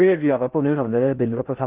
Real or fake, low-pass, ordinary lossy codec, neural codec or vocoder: fake; 3.6 kHz; Opus, 32 kbps; codec, 16 kHz in and 24 kHz out, 0.6 kbps, FocalCodec, streaming, 4096 codes